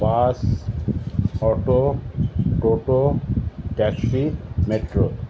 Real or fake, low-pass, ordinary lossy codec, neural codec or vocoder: real; none; none; none